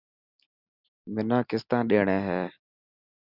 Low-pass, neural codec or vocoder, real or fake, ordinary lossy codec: 5.4 kHz; none; real; Opus, 64 kbps